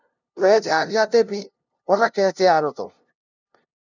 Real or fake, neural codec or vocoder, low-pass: fake; codec, 16 kHz, 0.5 kbps, FunCodec, trained on LibriTTS, 25 frames a second; 7.2 kHz